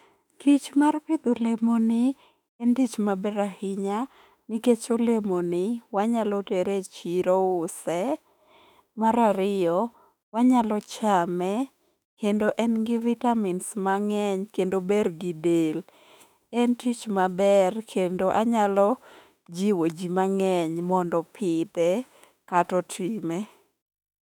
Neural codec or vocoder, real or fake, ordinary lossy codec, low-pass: autoencoder, 48 kHz, 32 numbers a frame, DAC-VAE, trained on Japanese speech; fake; none; 19.8 kHz